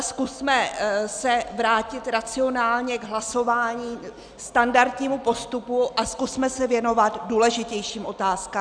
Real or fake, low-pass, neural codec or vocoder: real; 9.9 kHz; none